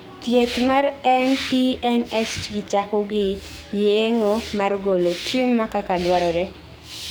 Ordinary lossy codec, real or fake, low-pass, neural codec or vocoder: none; fake; none; codec, 44.1 kHz, 2.6 kbps, SNAC